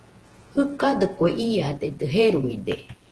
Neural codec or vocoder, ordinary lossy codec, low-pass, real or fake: vocoder, 48 kHz, 128 mel bands, Vocos; Opus, 16 kbps; 10.8 kHz; fake